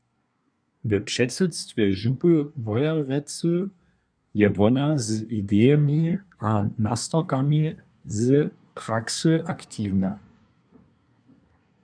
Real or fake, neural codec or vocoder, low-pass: fake; codec, 24 kHz, 1 kbps, SNAC; 9.9 kHz